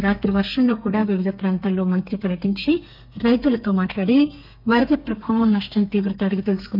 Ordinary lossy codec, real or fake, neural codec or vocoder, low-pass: none; fake; codec, 32 kHz, 1.9 kbps, SNAC; 5.4 kHz